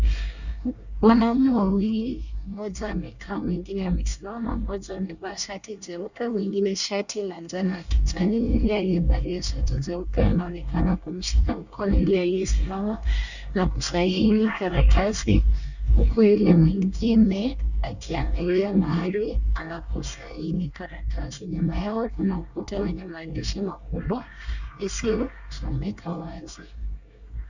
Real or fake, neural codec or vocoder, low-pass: fake; codec, 24 kHz, 1 kbps, SNAC; 7.2 kHz